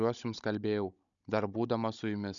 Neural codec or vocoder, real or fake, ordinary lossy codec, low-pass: codec, 16 kHz, 16 kbps, FunCodec, trained on Chinese and English, 50 frames a second; fake; MP3, 96 kbps; 7.2 kHz